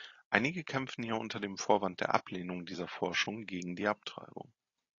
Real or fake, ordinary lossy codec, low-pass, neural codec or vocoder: real; AAC, 32 kbps; 7.2 kHz; none